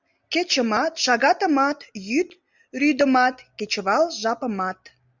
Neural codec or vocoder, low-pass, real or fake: none; 7.2 kHz; real